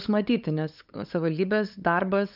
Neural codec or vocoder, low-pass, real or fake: codec, 16 kHz, 8 kbps, FunCodec, trained on LibriTTS, 25 frames a second; 5.4 kHz; fake